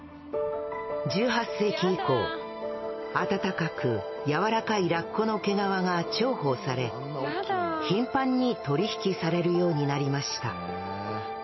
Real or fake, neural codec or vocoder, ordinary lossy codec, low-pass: real; none; MP3, 24 kbps; 7.2 kHz